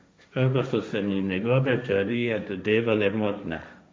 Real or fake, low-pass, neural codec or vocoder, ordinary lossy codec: fake; none; codec, 16 kHz, 1.1 kbps, Voila-Tokenizer; none